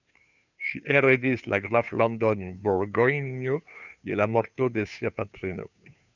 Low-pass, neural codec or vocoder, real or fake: 7.2 kHz; codec, 16 kHz, 2 kbps, FunCodec, trained on Chinese and English, 25 frames a second; fake